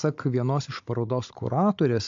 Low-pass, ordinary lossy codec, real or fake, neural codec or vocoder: 7.2 kHz; AAC, 48 kbps; fake; codec, 16 kHz, 16 kbps, FunCodec, trained on Chinese and English, 50 frames a second